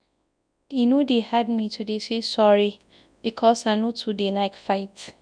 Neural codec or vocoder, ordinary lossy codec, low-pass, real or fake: codec, 24 kHz, 0.9 kbps, WavTokenizer, large speech release; none; 9.9 kHz; fake